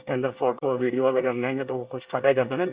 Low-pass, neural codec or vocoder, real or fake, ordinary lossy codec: 3.6 kHz; codec, 24 kHz, 1 kbps, SNAC; fake; Opus, 64 kbps